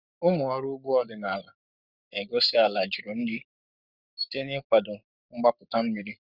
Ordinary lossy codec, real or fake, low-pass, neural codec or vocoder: Opus, 64 kbps; fake; 5.4 kHz; codec, 44.1 kHz, 7.8 kbps, Pupu-Codec